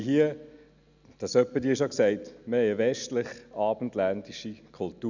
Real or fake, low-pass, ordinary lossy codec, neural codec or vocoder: real; 7.2 kHz; none; none